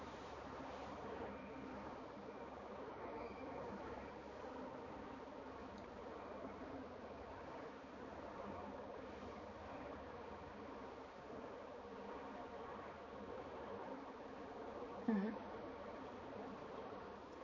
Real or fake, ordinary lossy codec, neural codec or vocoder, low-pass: fake; MP3, 32 kbps; codec, 16 kHz, 4 kbps, X-Codec, HuBERT features, trained on balanced general audio; 7.2 kHz